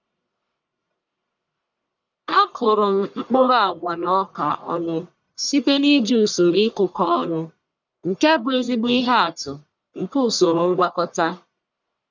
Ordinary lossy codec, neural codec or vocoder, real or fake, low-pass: none; codec, 44.1 kHz, 1.7 kbps, Pupu-Codec; fake; 7.2 kHz